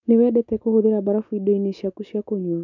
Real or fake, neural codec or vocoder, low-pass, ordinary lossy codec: real; none; 7.2 kHz; none